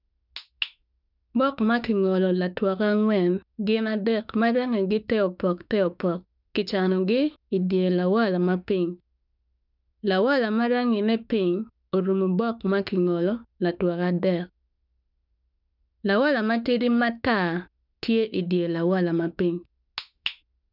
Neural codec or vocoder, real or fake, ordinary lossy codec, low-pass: autoencoder, 48 kHz, 32 numbers a frame, DAC-VAE, trained on Japanese speech; fake; none; 5.4 kHz